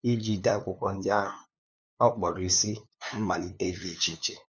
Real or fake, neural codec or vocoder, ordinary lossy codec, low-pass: fake; codec, 16 kHz, 2 kbps, FunCodec, trained on LibriTTS, 25 frames a second; none; none